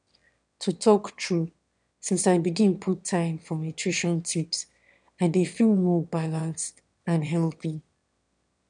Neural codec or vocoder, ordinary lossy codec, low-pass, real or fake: autoencoder, 22.05 kHz, a latent of 192 numbers a frame, VITS, trained on one speaker; none; 9.9 kHz; fake